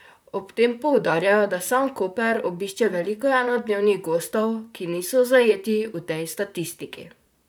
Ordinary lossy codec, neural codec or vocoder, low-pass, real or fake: none; vocoder, 44.1 kHz, 128 mel bands, Pupu-Vocoder; none; fake